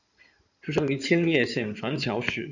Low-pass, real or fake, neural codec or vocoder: 7.2 kHz; fake; codec, 16 kHz in and 24 kHz out, 2.2 kbps, FireRedTTS-2 codec